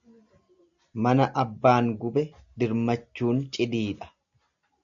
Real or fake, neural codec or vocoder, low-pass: real; none; 7.2 kHz